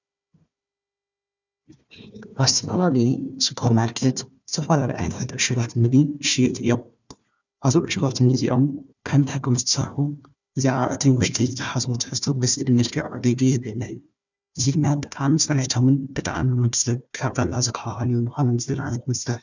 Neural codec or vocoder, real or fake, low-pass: codec, 16 kHz, 1 kbps, FunCodec, trained on Chinese and English, 50 frames a second; fake; 7.2 kHz